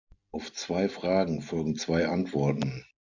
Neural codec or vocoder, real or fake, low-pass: none; real; 7.2 kHz